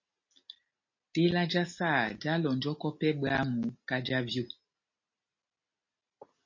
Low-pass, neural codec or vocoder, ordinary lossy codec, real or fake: 7.2 kHz; none; MP3, 32 kbps; real